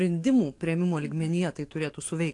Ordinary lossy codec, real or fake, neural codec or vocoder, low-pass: AAC, 48 kbps; fake; codec, 44.1 kHz, 7.8 kbps, DAC; 10.8 kHz